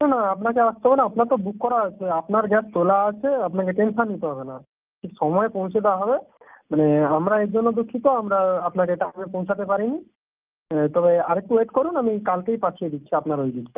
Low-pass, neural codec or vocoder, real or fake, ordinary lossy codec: 3.6 kHz; none; real; Opus, 24 kbps